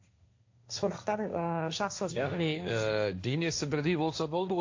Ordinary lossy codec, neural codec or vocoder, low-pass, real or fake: AAC, 48 kbps; codec, 16 kHz, 1.1 kbps, Voila-Tokenizer; 7.2 kHz; fake